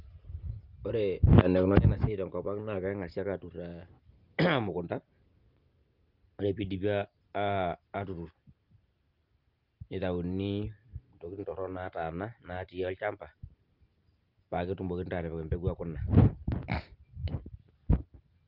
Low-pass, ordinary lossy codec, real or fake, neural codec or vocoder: 5.4 kHz; Opus, 32 kbps; real; none